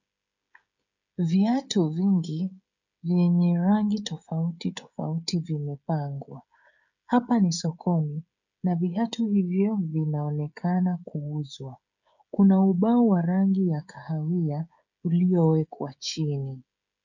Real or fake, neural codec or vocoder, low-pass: fake; codec, 16 kHz, 16 kbps, FreqCodec, smaller model; 7.2 kHz